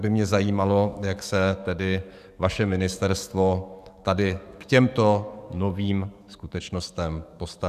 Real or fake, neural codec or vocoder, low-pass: fake; codec, 44.1 kHz, 7.8 kbps, DAC; 14.4 kHz